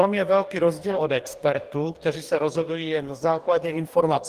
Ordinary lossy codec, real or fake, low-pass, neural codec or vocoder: Opus, 24 kbps; fake; 14.4 kHz; codec, 44.1 kHz, 2.6 kbps, DAC